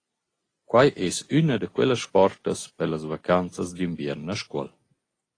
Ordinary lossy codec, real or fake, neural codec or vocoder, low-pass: AAC, 32 kbps; real; none; 9.9 kHz